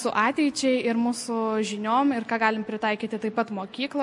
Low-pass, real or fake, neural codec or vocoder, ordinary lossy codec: 10.8 kHz; real; none; MP3, 48 kbps